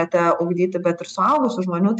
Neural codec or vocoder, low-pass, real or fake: none; 9.9 kHz; real